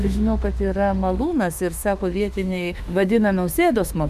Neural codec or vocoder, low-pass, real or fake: autoencoder, 48 kHz, 32 numbers a frame, DAC-VAE, trained on Japanese speech; 14.4 kHz; fake